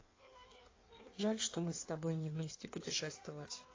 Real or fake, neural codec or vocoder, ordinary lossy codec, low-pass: fake; codec, 16 kHz in and 24 kHz out, 1.1 kbps, FireRedTTS-2 codec; AAC, 32 kbps; 7.2 kHz